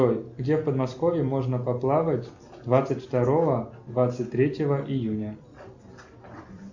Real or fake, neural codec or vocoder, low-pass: real; none; 7.2 kHz